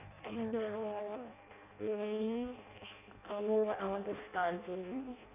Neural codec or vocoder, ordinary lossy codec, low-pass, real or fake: codec, 16 kHz in and 24 kHz out, 0.6 kbps, FireRedTTS-2 codec; none; 3.6 kHz; fake